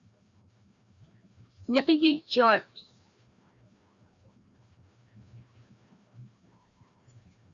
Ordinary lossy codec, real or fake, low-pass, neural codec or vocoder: Opus, 64 kbps; fake; 7.2 kHz; codec, 16 kHz, 1 kbps, FreqCodec, larger model